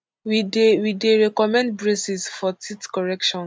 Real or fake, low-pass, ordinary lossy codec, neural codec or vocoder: real; none; none; none